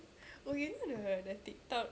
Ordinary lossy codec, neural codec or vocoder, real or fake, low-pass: none; none; real; none